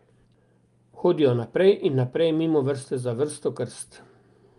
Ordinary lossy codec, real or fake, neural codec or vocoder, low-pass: Opus, 32 kbps; real; none; 10.8 kHz